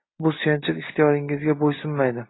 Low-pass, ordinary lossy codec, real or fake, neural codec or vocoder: 7.2 kHz; AAC, 16 kbps; real; none